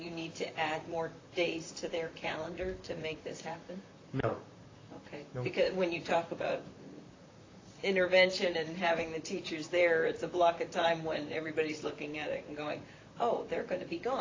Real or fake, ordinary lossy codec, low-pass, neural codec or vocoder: fake; AAC, 32 kbps; 7.2 kHz; vocoder, 44.1 kHz, 128 mel bands, Pupu-Vocoder